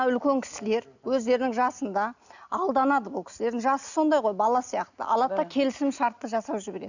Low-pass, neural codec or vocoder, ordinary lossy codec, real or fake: 7.2 kHz; none; none; real